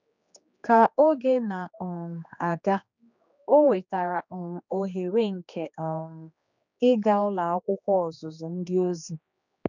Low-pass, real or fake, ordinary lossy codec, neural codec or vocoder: 7.2 kHz; fake; none; codec, 16 kHz, 2 kbps, X-Codec, HuBERT features, trained on general audio